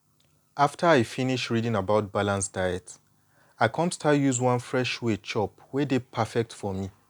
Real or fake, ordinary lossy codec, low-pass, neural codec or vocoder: real; none; 19.8 kHz; none